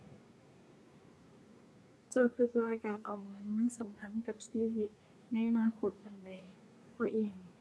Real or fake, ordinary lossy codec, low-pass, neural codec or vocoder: fake; none; none; codec, 24 kHz, 1 kbps, SNAC